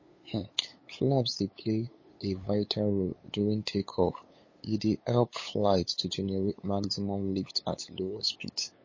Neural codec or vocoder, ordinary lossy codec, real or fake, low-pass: codec, 16 kHz, 8 kbps, FunCodec, trained on LibriTTS, 25 frames a second; MP3, 32 kbps; fake; 7.2 kHz